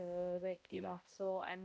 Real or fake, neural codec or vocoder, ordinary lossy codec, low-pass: fake; codec, 16 kHz, 0.5 kbps, X-Codec, HuBERT features, trained on balanced general audio; none; none